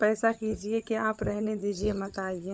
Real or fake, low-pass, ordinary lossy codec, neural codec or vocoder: fake; none; none; codec, 16 kHz, 16 kbps, FunCodec, trained on LibriTTS, 50 frames a second